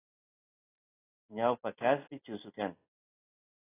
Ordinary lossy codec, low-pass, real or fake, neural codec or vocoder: AAC, 16 kbps; 3.6 kHz; real; none